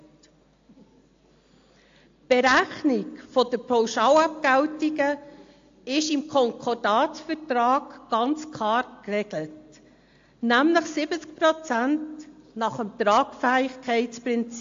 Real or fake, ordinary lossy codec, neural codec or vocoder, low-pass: real; AAC, 48 kbps; none; 7.2 kHz